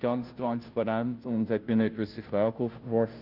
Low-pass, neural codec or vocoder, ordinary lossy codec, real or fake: 5.4 kHz; codec, 16 kHz, 0.5 kbps, FunCodec, trained on Chinese and English, 25 frames a second; Opus, 32 kbps; fake